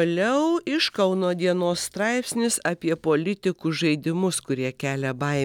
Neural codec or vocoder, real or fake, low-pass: none; real; 19.8 kHz